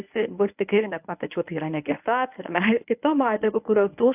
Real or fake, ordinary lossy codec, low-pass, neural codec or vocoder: fake; AAC, 32 kbps; 3.6 kHz; codec, 24 kHz, 0.9 kbps, WavTokenizer, medium speech release version 1